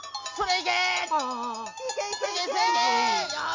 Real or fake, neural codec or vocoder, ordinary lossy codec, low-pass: real; none; MP3, 64 kbps; 7.2 kHz